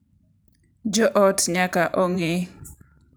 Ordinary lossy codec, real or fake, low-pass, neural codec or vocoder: none; fake; none; vocoder, 44.1 kHz, 128 mel bands every 512 samples, BigVGAN v2